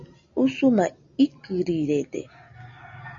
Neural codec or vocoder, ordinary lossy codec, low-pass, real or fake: none; MP3, 64 kbps; 7.2 kHz; real